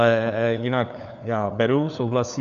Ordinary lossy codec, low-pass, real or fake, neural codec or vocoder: AAC, 96 kbps; 7.2 kHz; fake; codec, 16 kHz, 4 kbps, FunCodec, trained on LibriTTS, 50 frames a second